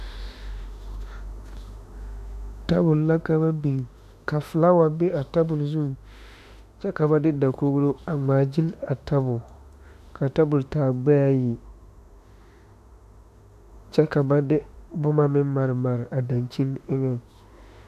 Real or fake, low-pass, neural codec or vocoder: fake; 14.4 kHz; autoencoder, 48 kHz, 32 numbers a frame, DAC-VAE, trained on Japanese speech